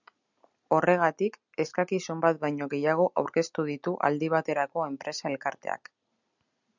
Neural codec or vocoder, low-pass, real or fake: none; 7.2 kHz; real